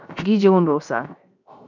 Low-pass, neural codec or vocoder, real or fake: 7.2 kHz; codec, 16 kHz, 0.7 kbps, FocalCodec; fake